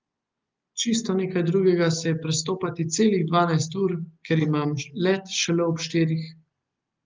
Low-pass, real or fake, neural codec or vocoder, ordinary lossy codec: 7.2 kHz; real; none; Opus, 32 kbps